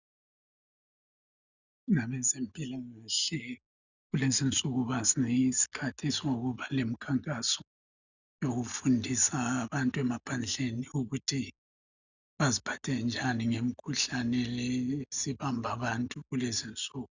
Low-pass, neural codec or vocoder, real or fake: 7.2 kHz; none; real